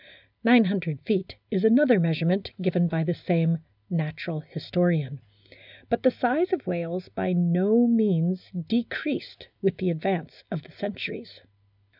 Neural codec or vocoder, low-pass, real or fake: none; 5.4 kHz; real